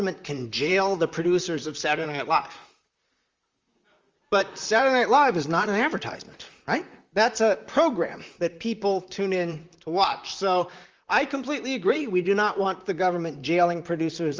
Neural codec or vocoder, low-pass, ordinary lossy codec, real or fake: vocoder, 44.1 kHz, 128 mel bands, Pupu-Vocoder; 7.2 kHz; Opus, 32 kbps; fake